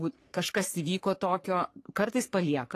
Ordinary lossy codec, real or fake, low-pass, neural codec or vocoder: AAC, 48 kbps; fake; 14.4 kHz; codec, 44.1 kHz, 3.4 kbps, Pupu-Codec